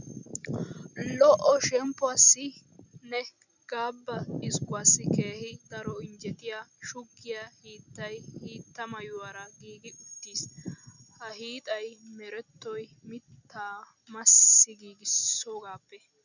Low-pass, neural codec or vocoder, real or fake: 7.2 kHz; none; real